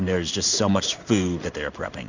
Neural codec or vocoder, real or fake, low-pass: codec, 16 kHz in and 24 kHz out, 1 kbps, XY-Tokenizer; fake; 7.2 kHz